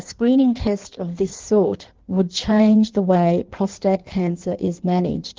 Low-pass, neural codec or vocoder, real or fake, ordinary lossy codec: 7.2 kHz; codec, 16 kHz in and 24 kHz out, 1.1 kbps, FireRedTTS-2 codec; fake; Opus, 16 kbps